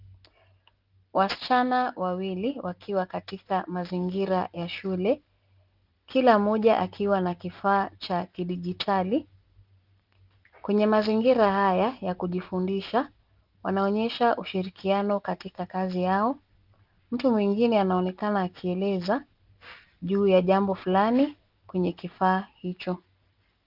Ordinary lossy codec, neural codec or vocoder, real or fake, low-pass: Opus, 32 kbps; none; real; 5.4 kHz